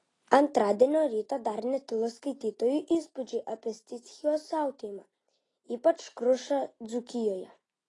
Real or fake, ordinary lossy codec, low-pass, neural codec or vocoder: real; AAC, 32 kbps; 10.8 kHz; none